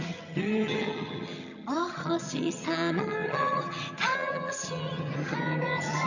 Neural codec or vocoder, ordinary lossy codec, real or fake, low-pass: vocoder, 22.05 kHz, 80 mel bands, HiFi-GAN; none; fake; 7.2 kHz